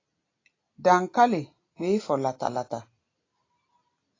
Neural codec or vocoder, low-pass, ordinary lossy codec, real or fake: none; 7.2 kHz; AAC, 32 kbps; real